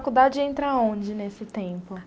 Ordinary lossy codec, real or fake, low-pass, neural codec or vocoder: none; real; none; none